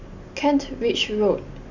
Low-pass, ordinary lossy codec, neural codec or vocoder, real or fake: 7.2 kHz; none; none; real